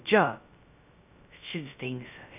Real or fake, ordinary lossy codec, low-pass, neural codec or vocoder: fake; none; 3.6 kHz; codec, 16 kHz, 0.2 kbps, FocalCodec